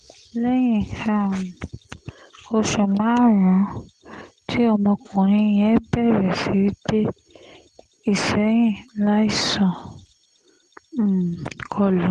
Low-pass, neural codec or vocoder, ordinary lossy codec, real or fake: 9.9 kHz; none; Opus, 16 kbps; real